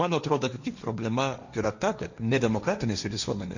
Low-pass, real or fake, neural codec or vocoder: 7.2 kHz; fake; codec, 16 kHz, 1.1 kbps, Voila-Tokenizer